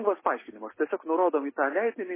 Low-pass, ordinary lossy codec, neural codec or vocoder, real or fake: 3.6 kHz; MP3, 16 kbps; none; real